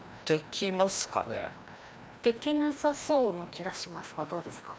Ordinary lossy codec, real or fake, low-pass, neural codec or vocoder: none; fake; none; codec, 16 kHz, 1 kbps, FreqCodec, larger model